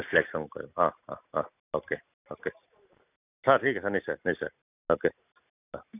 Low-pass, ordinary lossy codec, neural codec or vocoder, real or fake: 3.6 kHz; none; none; real